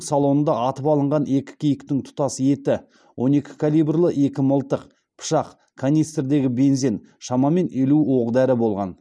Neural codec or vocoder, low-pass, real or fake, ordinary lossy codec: none; none; real; none